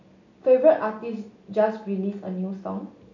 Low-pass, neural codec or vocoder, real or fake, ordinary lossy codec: 7.2 kHz; none; real; none